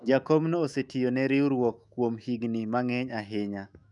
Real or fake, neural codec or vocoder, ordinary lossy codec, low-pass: fake; autoencoder, 48 kHz, 128 numbers a frame, DAC-VAE, trained on Japanese speech; none; 10.8 kHz